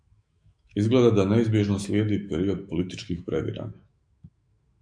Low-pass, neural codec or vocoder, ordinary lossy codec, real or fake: 9.9 kHz; autoencoder, 48 kHz, 128 numbers a frame, DAC-VAE, trained on Japanese speech; MP3, 64 kbps; fake